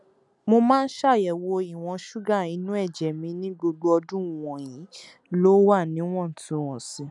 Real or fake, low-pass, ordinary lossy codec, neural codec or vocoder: real; 10.8 kHz; none; none